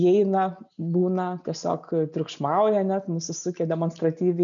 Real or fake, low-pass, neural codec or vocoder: real; 7.2 kHz; none